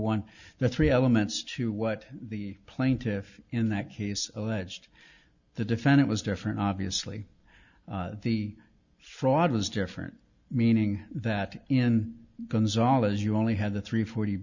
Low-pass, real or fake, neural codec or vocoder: 7.2 kHz; real; none